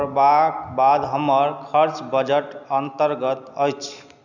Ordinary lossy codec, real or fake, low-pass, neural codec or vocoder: none; real; 7.2 kHz; none